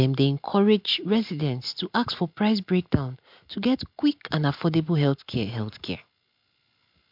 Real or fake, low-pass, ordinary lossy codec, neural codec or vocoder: real; 5.4 kHz; MP3, 48 kbps; none